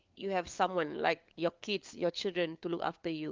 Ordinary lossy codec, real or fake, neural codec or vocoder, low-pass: Opus, 32 kbps; fake; codec, 16 kHz, 4 kbps, FunCodec, trained on LibriTTS, 50 frames a second; 7.2 kHz